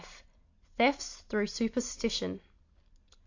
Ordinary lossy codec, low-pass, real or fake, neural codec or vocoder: AAC, 48 kbps; 7.2 kHz; real; none